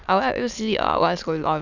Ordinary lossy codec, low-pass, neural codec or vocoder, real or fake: none; 7.2 kHz; autoencoder, 22.05 kHz, a latent of 192 numbers a frame, VITS, trained on many speakers; fake